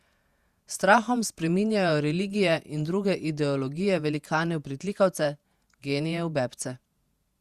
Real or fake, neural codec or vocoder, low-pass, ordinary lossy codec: fake; vocoder, 48 kHz, 128 mel bands, Vocos; 14.4 kHz; Opus, 64 kbps